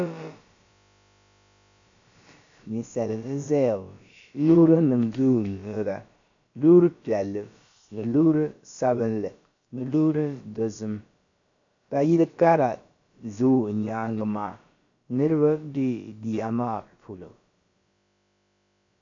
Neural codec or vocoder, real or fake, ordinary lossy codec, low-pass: codec, 16 kHz, about 1 kbps, DyCAST, with the encoder's durations; fake; MP3, 64 kbps; 7.2 kHz